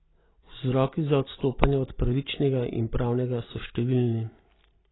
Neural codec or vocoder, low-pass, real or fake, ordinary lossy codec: none; 7.2 kHz; real; AAC, 16 kbps